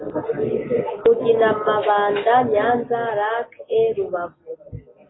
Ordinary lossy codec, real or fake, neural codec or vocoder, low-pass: AAC, 16 kbps; real; none; 7.2 kHz